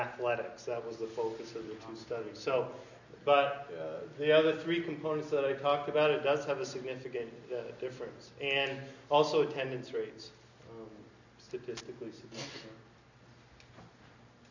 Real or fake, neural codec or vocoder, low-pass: real; none; 7.2 kHz